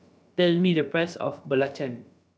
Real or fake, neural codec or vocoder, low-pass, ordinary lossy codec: fake; codec, 16 kHz, about 1 kbps, DyCAST, with the encoder's durations; none; none